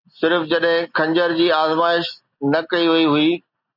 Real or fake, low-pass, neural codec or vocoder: real; 5.4 kHz; none